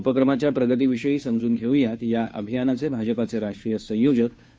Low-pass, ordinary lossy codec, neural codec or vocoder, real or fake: none; none; codec, 16 kHz, 2 kbps, FunCodec, trained on Chinese and English, 25 frames a second; fake